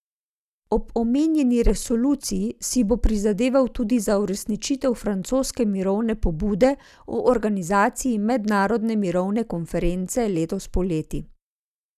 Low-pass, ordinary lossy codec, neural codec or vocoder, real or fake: 14.4 kHz; none; none; real